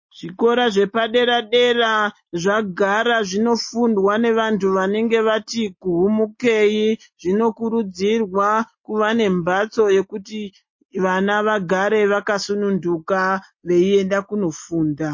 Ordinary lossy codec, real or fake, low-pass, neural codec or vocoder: MP3, 32 kbps; real; 7.2 kHz; none